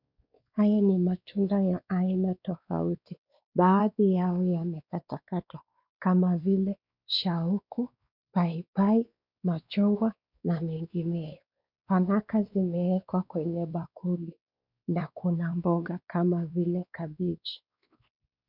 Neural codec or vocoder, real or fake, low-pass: codec, 16 kHz, 2 kbps, X-Codec, WavLM features, trained on Multilingual LibriSpeech; fake; 5.4 kHz